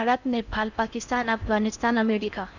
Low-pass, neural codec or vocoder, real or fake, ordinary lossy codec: 7.2 kHz; codec, 16 kHz in and 24 kHz out, 0.6 kbps, FocalCodec, streaming, 4096 codes; fake; none